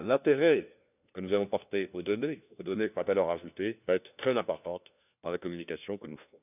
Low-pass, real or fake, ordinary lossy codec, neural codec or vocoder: 3.6 kHz; fake; none; codec, 16 kHz, 1 kbps, FunCodec, trained on LibriTTS, 50 frames a second